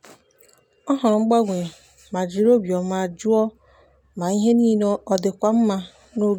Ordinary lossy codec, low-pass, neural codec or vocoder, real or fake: none; 19.8 kHz; none; real